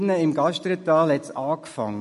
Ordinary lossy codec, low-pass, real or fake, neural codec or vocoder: MP3, 48 kbps; 14.4 kHz; real; none